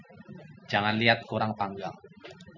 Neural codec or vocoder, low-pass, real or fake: none; 7.2 kHz; real